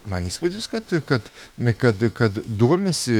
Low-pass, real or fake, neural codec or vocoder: 19.8 kHz; fake; autoencoder, 48 kHz, 32 numbers a frame, DAC-VAE, trained on Japanese speech